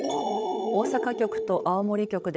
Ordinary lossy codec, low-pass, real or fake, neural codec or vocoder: none; none; fake; codec, 16 kHz, 8 kbps, FreqCodec, larger model